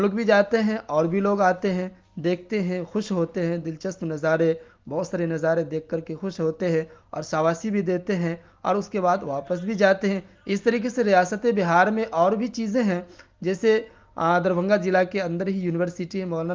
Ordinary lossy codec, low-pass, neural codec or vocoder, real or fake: Opus, 32 kbps; 7.2 kHz; none; real